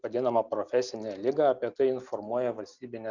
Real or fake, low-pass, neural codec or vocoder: real; 7.2 kHz; none